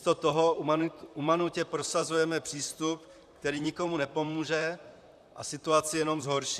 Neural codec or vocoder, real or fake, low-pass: vocoder, 44.1 kHz, 128 mel bands, Pupu-Vocoder; fake; 14.4 kHz